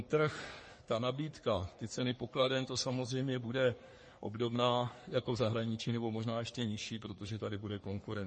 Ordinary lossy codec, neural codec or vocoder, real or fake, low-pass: MP3, 32 kbps; codec, 44.1 kHz, 3.4 kbps, Pupu-Codec; fake; 10.8 kHz